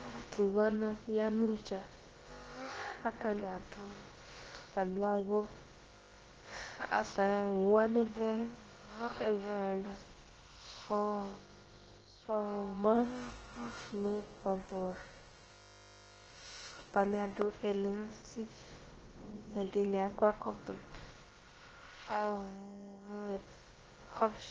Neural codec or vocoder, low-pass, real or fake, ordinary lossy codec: codec, 16 kHz, about 1 kbps, DyCAST, with the encoder's durations; 7.2 kHz; fake; Opus, 16 kbps